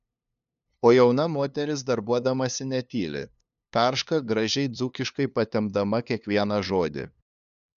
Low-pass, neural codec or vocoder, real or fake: 7.2 kHz; codec, 16 kHz, 2 kbps, FunCodec, trained on LibriTTS, 25 frames a second; fake